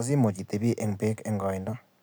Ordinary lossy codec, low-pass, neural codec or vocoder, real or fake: none; none; none; real